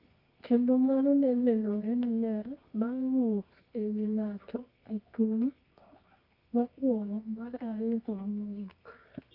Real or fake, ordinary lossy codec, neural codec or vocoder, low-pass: fake; none; codec, 24 kHz, 0.9 kbps, WavTokenizer, medium music audio release; 5.4 kHz